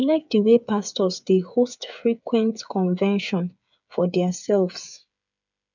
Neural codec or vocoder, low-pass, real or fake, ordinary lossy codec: codec, 16 kHz, 8 kbps, FreqCodec, smaller model; 7.2 kHz; fake; none